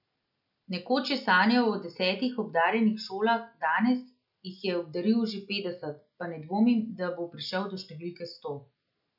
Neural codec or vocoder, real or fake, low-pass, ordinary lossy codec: none; real; 5.4 kHz; none